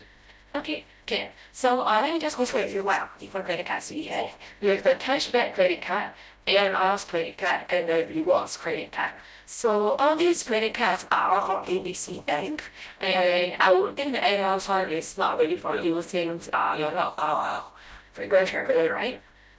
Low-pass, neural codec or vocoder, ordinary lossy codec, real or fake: none; codec, 16 kHz, 0.5 kbps, FreqCodec, smaller model; none; fake